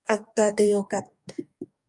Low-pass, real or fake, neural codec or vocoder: 10.8 kHz; fake; codec, 44.1 kHz, 2.6 kbps, DAC